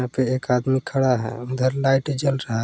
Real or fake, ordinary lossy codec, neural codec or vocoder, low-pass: real; none; none; none